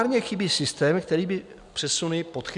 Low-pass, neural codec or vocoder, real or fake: 10.8 kHz; none; real